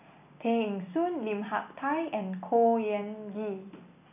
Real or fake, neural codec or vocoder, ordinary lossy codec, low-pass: real; none; none; 3.6 kHz